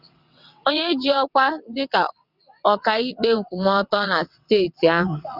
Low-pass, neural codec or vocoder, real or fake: 5.4 kHz; vocoder, 22.05 kHz, 80 mel bands, WaveNeXt; fake